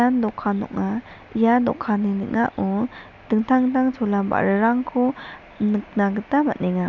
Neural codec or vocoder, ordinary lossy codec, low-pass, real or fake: none; none; 7.2 kHz; real